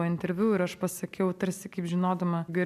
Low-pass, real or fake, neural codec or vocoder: 14.4 kHz; real; none